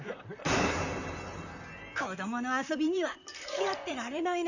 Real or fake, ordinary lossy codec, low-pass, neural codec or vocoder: fake; none; 7.2 kHz; vocoder, 44.1 kHz, 128 mel bands, Pupu-Vocoder